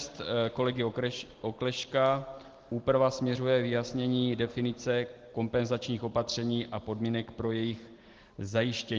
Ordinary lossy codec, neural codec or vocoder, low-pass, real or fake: Opus, 16 kbps; none; 7.2 kHz; real